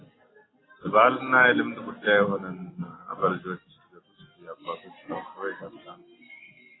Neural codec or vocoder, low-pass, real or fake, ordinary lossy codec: none; 7.2 kHz; real; AAC, 16 kbps